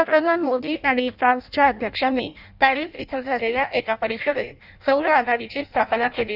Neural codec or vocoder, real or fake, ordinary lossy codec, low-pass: codec, 16 kHz in and 24 kHz out, 0.6 kbps, FireRedTTS-2 codec; fake; none; 5.4 kHz